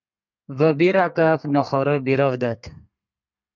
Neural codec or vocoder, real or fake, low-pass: codec, 32 kHz, 1.9 kbps, SNAC; fake; 7.2 kHz